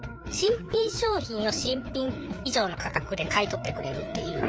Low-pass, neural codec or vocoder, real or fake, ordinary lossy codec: none; codec, 16 kHz, 4 kbps, FreqCodec, larger model; fake; none